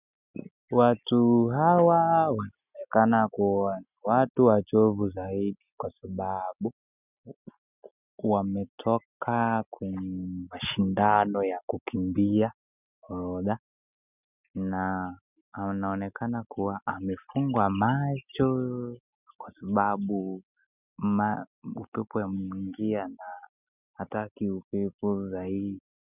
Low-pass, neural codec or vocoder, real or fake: 3.6 kHz; none; real